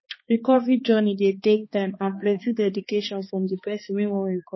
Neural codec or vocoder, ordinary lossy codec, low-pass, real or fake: codec, 16 kHz, 2 kbps, X-Codec, HuBERT features, trained on balanced general audio; MP3, 24 kbps; 7.2 kHz; fake